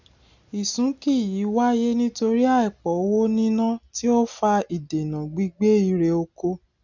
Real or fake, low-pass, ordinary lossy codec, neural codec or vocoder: real; 7.2 kHz; none; none